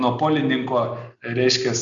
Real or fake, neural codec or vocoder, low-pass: real; none; 7.2 kHz